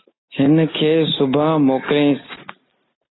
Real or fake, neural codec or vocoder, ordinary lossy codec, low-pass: real; none; AAC, 16 kbps; 7.2 kHz